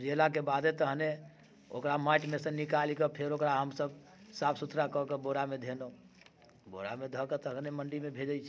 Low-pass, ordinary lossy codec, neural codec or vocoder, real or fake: none; none; none; real